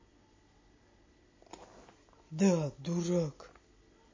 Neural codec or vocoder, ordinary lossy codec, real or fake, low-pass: none; MP3, 32 kbps; real; 7.2 kHz